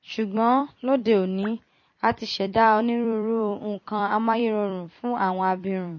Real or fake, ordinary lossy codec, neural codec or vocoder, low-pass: fake; MP3, 32 kbps; vocoder, 44.1 kHz, 128 mel bands every 256 samples, BigVGAN v2; 7.2 kHz